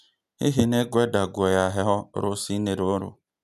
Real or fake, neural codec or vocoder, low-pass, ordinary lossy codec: real; none; 14.4 kHz; none